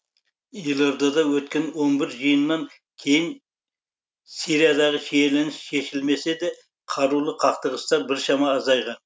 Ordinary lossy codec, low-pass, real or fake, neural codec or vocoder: none; none; real; none